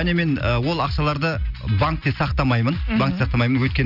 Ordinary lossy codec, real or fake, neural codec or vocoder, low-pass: none; real; none; 5.4 kHz